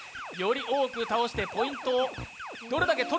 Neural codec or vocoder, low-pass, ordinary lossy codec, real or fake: none; none; none; real